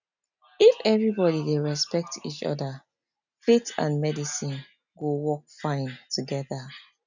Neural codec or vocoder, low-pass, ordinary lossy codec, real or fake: none; 7.2 kHz; none; real